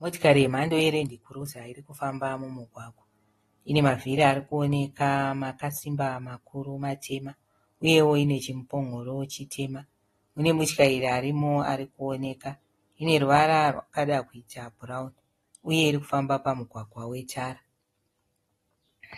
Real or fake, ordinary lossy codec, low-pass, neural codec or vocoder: real; AAC, 32 kbps; 19.8 kHz; none